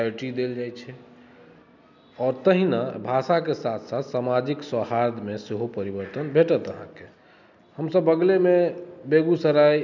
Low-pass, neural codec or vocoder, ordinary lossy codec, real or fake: 7.2 kHz; none; none; real